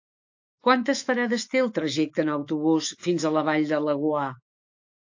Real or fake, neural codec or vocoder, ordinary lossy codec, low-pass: fake; autoencoder, 48 kHz, 128 numbers a frame, DAC-VAE, trained on Japanese speech; AAC, 48 kbps; 7.2 kHz